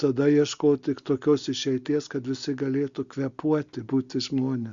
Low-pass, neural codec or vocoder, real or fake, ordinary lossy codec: 7.2 kHz; none; real; Opus, 64 kbps